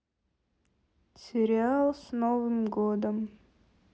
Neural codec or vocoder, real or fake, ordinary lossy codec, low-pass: none; real; none; none